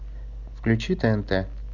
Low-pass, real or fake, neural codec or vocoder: 7.2 kHz; real; none